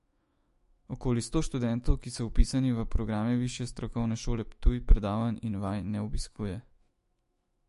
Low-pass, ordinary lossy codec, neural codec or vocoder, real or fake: 14.4 kHz; MP3, 48 kbps; autoencoder, 48 kHz, 128 numbers a frame, DAC-VAE, trained on Japanese speech; fake